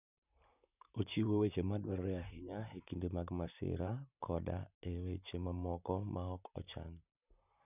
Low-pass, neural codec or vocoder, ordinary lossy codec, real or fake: 3.6 kHz; vocoder, 44.1 kHz, 128 mel bands, Pupu-Vocoder; none; fake